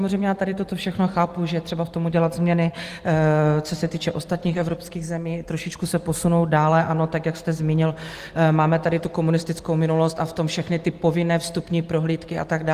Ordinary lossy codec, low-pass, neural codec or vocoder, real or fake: Opus, 24 kbps; 14.4 kHz; none; real